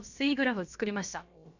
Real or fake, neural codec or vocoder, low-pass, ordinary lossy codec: fake; codec, 16 kHz, about 1 kbps, DyCAST, with the encoder's durations; 7.2 kHz; none